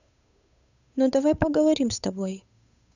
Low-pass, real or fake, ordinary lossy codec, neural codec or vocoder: 7.2 kHz; fake; none; codec, 16 kHz, 8 kbps, FunCodec, trained on Chinese and English, 25 frames a second